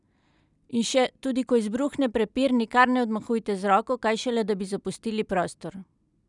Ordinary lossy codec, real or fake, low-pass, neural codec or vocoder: none; real; 10.8 kHz; none